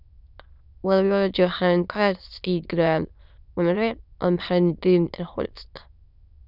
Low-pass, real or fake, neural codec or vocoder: 5.4 kHz; fake; autoencoder, 22.05 kHz, a latent of 192 numbers a frame, VITS, trained on many speakers